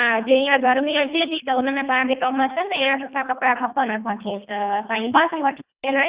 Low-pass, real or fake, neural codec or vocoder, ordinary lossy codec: 3.6 kHz; fake; codec, 24 kHz, 1.5 kbps, HILCodec; Opus, 64 kbps